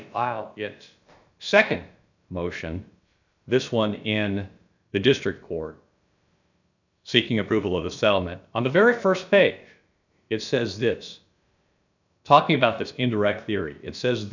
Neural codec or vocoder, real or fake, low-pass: codec, 16 kHz, about 1 kbps, DyCAST, with the encoder's durations; fake; 7.2 kHz